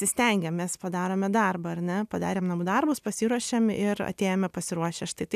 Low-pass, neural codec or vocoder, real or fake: 14.4 kHz; none; real